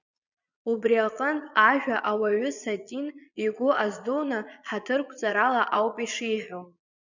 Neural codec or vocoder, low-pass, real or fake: vocoder, 22.05 kHz, 80 mel bands, Vocos; 7.2 kHz; fake